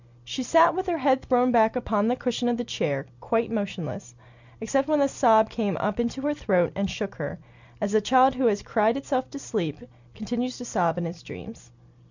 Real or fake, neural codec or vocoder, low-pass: real; none; 7.2 kHz